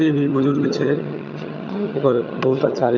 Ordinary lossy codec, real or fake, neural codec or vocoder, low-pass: none; fake; vocoder, 22.05 kHz, 80 mel bands, HiFi-GAN; 7.2 kHz